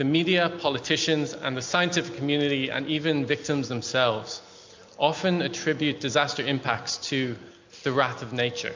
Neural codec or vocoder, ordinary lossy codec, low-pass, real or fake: none; MP3, 64 kbps; 7.2 kHz; real